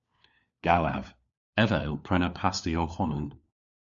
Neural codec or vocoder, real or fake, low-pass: codec, 16 kHz, 4 kbps, FunCodec, trained on LibriTTS, 50 frames a second; fake; 7.2 kHz